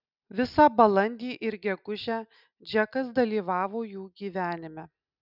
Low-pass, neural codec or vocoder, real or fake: 5.4 kHz; none; real